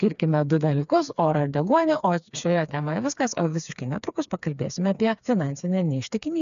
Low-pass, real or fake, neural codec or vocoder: 7.2 kHz; fake; codec, 16 kHz, 4 kbps, FreqCodec, smaller model